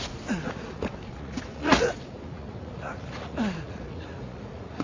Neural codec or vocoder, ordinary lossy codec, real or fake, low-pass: vocoder, 44.1 kHz, 80 mel bands, Vocos; AAC, 32 kbps; fake; 7.2 kHz